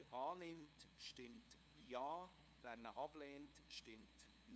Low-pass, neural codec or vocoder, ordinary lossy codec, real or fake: none; codec, 16 kHz, 2 kbps, FunCodec, trained on LibriTTS, 25 frames a second; none; fake